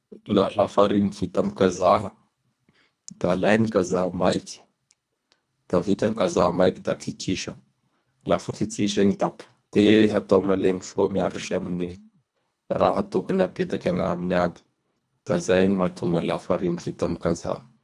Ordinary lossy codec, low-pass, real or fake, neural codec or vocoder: none; none; fake; codec, 24 kHz, 1.5 kbps, HILCodec